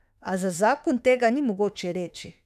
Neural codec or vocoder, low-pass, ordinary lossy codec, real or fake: autoencoder, 48 kHz, 32 numbers a frame, DAC-VAE, trained on Japanese speech; 14.4 kHz; none; fake